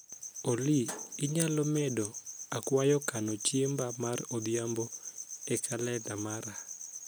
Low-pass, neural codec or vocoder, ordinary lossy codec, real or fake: none; none; none; real